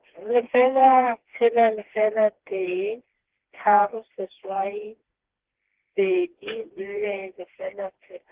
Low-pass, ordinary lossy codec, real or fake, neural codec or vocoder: 3.6 kHz; Opus, 16 kbps; fake; codec, 16 kHz, 2 kbps, FreqCodec, smaller model